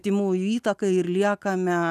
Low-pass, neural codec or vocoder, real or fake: 14.4 kHz; none; real